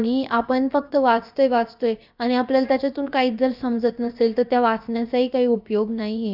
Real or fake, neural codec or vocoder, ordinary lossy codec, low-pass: fake; codec, 16 kHz, about 1 kbps, DyCAST, with the encoder's durations; none; 5.4 kHz